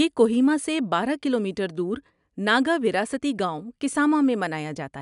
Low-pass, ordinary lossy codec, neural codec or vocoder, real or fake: 10.8 kHz; none; none; real